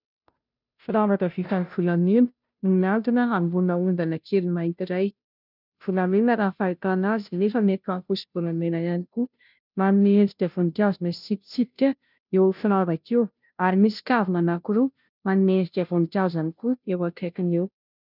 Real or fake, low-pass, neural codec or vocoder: fake; 5.4 kHz; codec, 16 kHz, 0.5 kbps, FunCodec, trained on Chinese and English, 25 frames a second